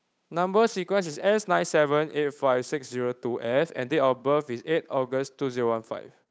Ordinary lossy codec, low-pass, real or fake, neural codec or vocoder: none; none; fake; codec, 16 kHz, 8 kbps, FunCodec, trained on Chinese and English, 25 frames a second